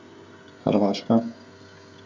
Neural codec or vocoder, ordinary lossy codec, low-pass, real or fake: codec, 16 kHz, 16 kbps, FreqCodec, smaller model; none; none; fake